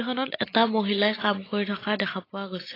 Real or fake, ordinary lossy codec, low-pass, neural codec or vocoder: real; AAC, 24 kbps; 5.4 kHz; none